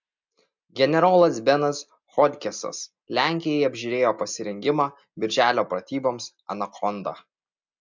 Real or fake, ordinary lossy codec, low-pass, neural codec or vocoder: real; MP3, 64 kbps; 7.2 kHz; none